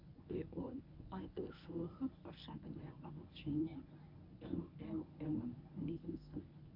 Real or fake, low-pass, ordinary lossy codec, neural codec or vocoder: fake; 5.4 kHz; MP3, 48 kbps; codec, 24 kHz, 0.9 kbps, WavTokenizer, medium speech release version 1